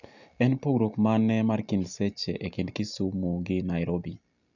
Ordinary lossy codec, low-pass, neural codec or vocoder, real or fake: none; 7.2 kHz; none; real